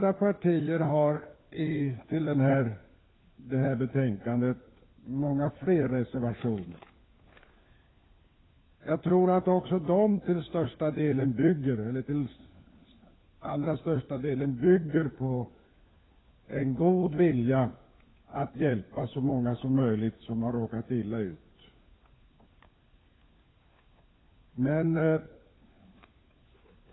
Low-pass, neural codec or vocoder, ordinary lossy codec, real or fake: 7.2 kHz; codec, 16 kHz, 4 kbps, FunCodec, trained on LibriTTS, 50 frames a second; AAC, 16 kbps; fake